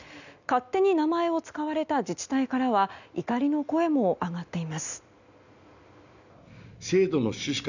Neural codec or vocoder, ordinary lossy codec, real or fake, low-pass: none; none; real; 7.2 kHz